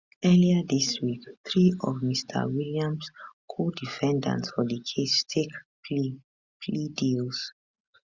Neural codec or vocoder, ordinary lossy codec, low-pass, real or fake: none; Opus, 64 kbps; 7.2 kHz; real